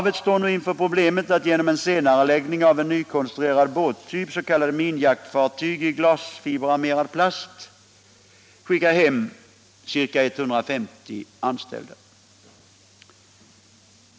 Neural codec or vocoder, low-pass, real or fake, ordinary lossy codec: none; none; real; none